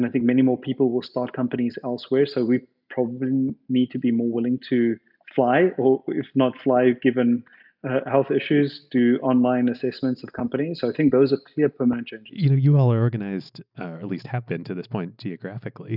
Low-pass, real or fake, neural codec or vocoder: 5.4 kHz; real; none